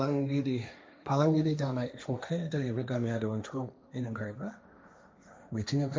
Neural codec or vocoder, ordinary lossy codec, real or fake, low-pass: codec, 16 kHz, 1.1 kbps, Voila-Tokenizer; none; fake; none